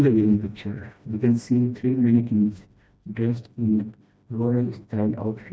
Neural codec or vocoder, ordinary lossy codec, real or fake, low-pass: codec, 16 kHz, 1 kbps, FreqCodec, smaller model; none; fake; none